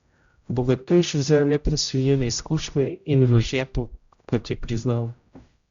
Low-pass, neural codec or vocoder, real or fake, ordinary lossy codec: 7.2 kHz; codec, 16 kHz, 0.5 kbps, X-Codec, HuBERT features, trained on general audio; fake; Opus, 64 kbps